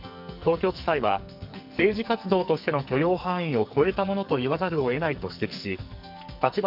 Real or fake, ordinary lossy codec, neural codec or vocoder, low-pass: fake; none; codec, 44.1 kHz, 2.6 kbps, SNAC; 5.4 kHz